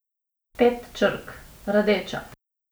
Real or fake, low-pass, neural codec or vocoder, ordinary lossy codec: real; none; none; none